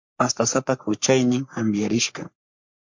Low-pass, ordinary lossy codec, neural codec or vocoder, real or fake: 7.2 kHz; MP3, 48 kbps; codec, 44.1 kHz, 3.4 kbps, Pupu-Codec; fake